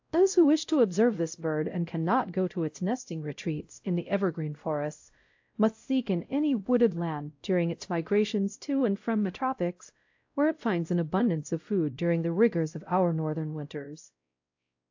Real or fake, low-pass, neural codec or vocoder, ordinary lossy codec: fake; 7.2 kHz; codec, 16 kHz, 0.5 kbps, X-Codec, WavLM features, trained on Multilingual LibriSpeech; AAC, 48 kbps